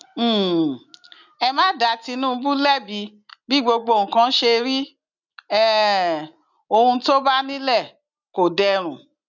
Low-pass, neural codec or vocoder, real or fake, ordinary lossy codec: 7.2 kHz; none; real; none